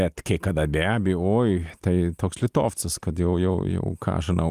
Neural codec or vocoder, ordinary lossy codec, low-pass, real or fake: none; Opus, 32 kbps; 14.4 kHz; real